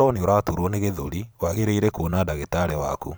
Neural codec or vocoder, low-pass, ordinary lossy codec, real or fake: vocoder, 44.1 kHz, 128 mel bands every 512 samples, BigVGAN v2; none; none; fake